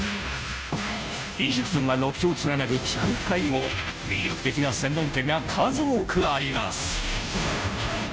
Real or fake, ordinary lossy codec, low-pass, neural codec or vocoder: fake; none; none; codec, 16 kHz, 0.5 kbps, FunCodec, trained on Chinese and English, 25 frames a second